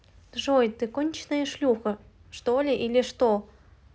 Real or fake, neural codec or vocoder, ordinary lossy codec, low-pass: real; none; none; none